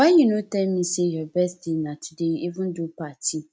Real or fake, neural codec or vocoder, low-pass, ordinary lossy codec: real; none; none; none